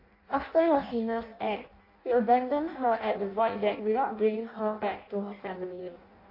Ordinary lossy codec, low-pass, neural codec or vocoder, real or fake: AAC, 32 kbps; 5.4 kHz; codec, 16 kHz in and 24 kHz out, 0.6 kbps, FireRedTTS-2 codec; fake